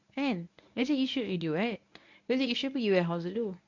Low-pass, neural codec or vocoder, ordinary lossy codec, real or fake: 7.2 kHz; codec, 24 kHz, 0.9 kbps, WavTokenizer, medium speech release version 1; MP3, 64 kbps; fake